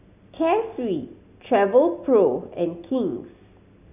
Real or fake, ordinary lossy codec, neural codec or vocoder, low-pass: real; none; none; 3.6 kHz